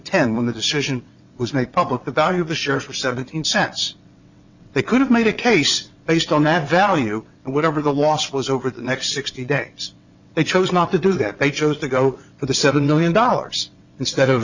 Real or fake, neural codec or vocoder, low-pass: fake; vocoder, 22.05 kHz, 80 mel bands, WaveNeXt; 7.2 kHz